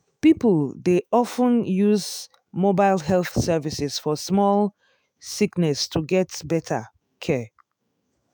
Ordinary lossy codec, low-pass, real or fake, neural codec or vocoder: none; none; fake; autoencoder, 48 kHz, 128 numbers a frame, DAC-VAE, trained on Japanese speech